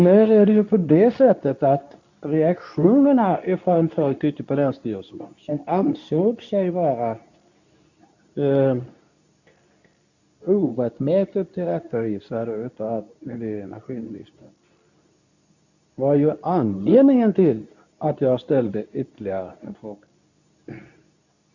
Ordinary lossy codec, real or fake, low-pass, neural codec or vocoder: none; fake; 7.2 kHz; codec, 24 kHz, 0.9 kbps, WavTokenizer, medium speech release version 2